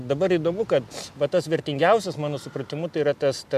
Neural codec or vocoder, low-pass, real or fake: none; 14.4 kHz; real